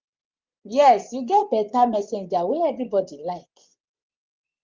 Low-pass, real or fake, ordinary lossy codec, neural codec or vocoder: 7.2 kHz; fake; Opus, 32 kbps; vocoder, 24 kHz, 100 mel bands, Vocos